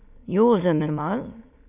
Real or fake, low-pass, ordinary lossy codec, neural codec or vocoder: fake; 3.6 kHz; none; autoencoder, 22.05 kHz, a latent of 192 numbers a frame, VITS, trained on many speakers